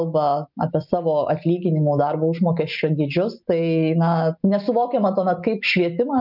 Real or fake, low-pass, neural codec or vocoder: real; 5.4 kHz; none